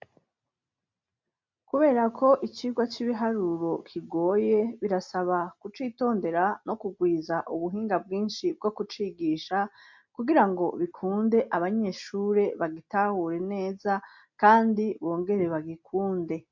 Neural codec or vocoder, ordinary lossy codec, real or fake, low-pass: none; MP3, 48 kbps; real; 7.2 kHz